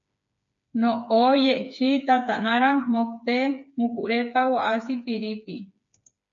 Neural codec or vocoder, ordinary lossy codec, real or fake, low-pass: codec, 16 kHz, 4 kbps, FreqCodec, smaller model; MP3, 64 kbps; fake; 7.2 kHz